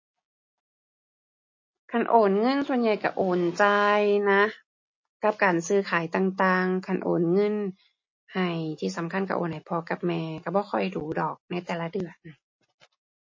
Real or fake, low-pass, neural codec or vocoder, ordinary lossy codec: real; 7.2 kHz; none; MP3, 32 kbps